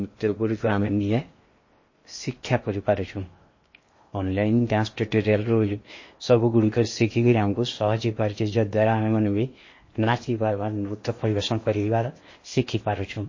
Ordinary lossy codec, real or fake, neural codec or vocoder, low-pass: MP3, 32 kbps; fake; codec, 16 kHz in and 24 kHz out, 0.8 kbps, FocalCodec, streaming, 65536 codes; 7.2 kHz